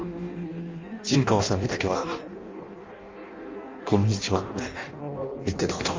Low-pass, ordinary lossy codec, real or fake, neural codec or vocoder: 7.2 kHz; Opus, 32 kbps; fake; codec, 16 kHz in and 24 kHz out, 0.6 kbps, FireRedTTS-2 codec